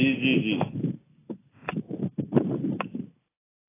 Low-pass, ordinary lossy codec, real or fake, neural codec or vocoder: 3.6 kHz; AAC, 16 kbps; real; none